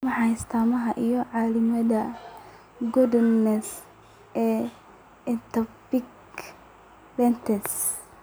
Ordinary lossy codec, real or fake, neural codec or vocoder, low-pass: none; real; none; none